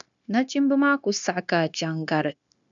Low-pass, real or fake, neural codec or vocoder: 7.2 kHz; fake; codec, 16 kHz, 0.9 kbps, LongCat-Audio-Codec